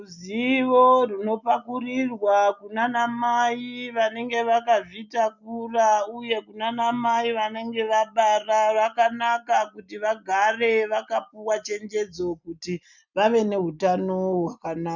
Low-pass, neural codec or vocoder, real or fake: 7.2 kHz; none; real